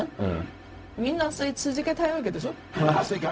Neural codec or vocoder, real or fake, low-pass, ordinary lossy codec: codec, 16 kHz, 0.4 kbps, LongCat-Audio-Codec; fake; none; none